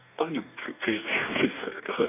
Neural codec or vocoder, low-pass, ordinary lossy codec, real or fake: codec, 44.1 kHz, 2.6 kbps, DAC; 3.6 kHz; none; fake